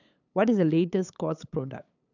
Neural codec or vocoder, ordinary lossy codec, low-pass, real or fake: codec, 16 kHz, 8 kbps, FunCodec, trained on LibriTTS, 25 frames a second; none; 7.2 kHz; fake